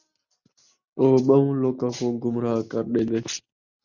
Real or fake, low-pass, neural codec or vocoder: real; 7.2 kHz; none